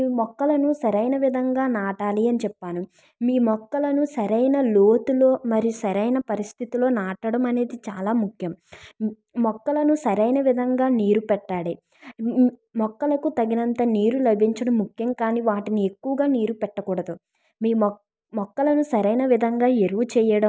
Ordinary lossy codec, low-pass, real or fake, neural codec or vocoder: none; none; real; none